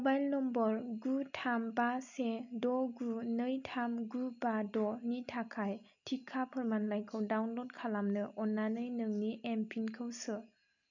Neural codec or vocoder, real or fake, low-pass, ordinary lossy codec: none; real; 7.2 kHz; none